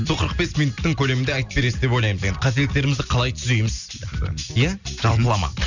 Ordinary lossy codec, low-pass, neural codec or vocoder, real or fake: none; 7.2 kHz; none; real